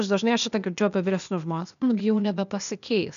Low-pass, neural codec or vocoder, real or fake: 7.2 kHz; codec, 16 kHz, 0.8 kbps, ZipCodec; fake